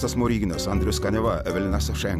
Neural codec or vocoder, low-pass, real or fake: none; 14.4 kHz; real